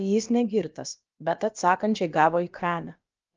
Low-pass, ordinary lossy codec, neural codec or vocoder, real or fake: 7.2 kHz; Opus, 24 kbps; codec, 16 kHz, about 1 kbps, DyCAST, with the encoder's durations; fake